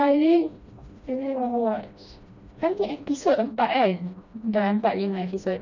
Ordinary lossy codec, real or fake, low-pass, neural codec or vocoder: none; fake; 7.2 kHz; codec, 16 kHz, 1 kbps, FreqCodec, smaller model